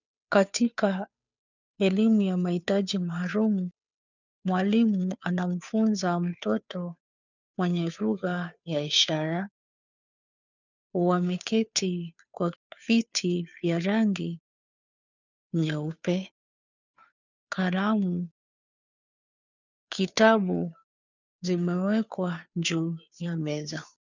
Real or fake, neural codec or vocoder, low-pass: fake; codec, 16 kHz, 2 kbps, FunCodec, trained on Chinese and English, 25 frames a second; 7.2 kHz